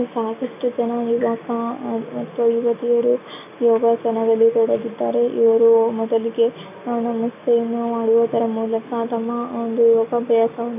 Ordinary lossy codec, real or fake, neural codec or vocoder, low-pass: none; real; none; 3.6 kHz